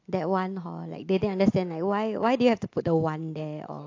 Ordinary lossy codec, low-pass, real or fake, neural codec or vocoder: AAC, 48 kbps; 7.2 kHz; real; none